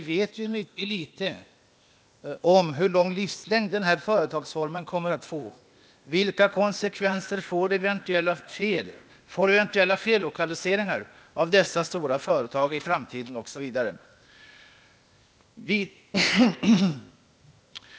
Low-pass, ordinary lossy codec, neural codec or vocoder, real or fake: none; none; codec, 16 kHz, 0.8 kbps, ZipCodec; fake